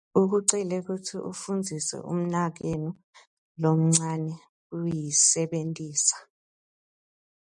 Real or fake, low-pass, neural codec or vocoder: real; 10.8 kHz; none